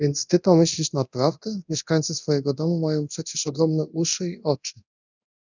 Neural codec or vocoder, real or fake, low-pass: codec, 24 kHz, 0.9 kbps, DualCodec; fake; 7.2 kHz